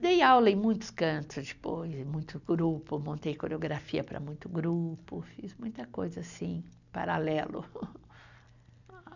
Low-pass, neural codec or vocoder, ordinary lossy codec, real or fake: 7.2 kHz; none; none; real